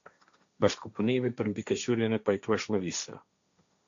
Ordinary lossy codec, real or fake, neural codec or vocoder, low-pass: MP3, 64 kbps; fake; codec, 16 kHz, 1.1 kbps, Voila-Tokenizer; 7.2 kHz